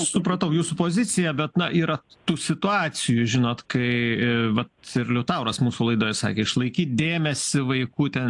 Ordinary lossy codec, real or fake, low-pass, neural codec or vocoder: AAC, 64 kbps; real; 10.8 kHz; none